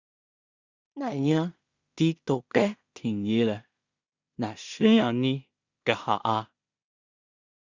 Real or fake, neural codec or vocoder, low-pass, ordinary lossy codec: fake; codec, 16 kHz in and 24 kHz out, 0.4 kbps, LongCat-Audio-Codec, two codebook decoder; 7.2 kHz; Opus, 64 kbps